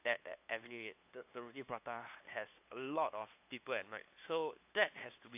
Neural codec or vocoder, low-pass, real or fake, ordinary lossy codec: codec, 16 kHz, 2 kbps, FunCodec, trained on LibriTTS, 25 frames a second; 3.6 kHz; fake; AAC, 32 kbps